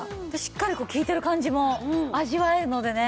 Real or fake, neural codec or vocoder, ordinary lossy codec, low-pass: real; none; none; none